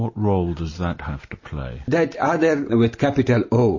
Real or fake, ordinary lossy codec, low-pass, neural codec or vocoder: real; MP3, 32 kbps; 7.2 kHz; none